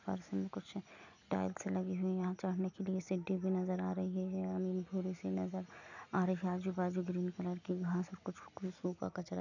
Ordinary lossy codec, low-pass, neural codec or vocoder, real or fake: none; 7.2 kHz; none; real